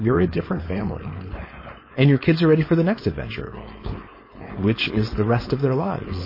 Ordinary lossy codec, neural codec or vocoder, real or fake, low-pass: MP3, 24 kbps; codec, 16 kHz, 4.8 kbps, FACodec; fake; 5.4 kHz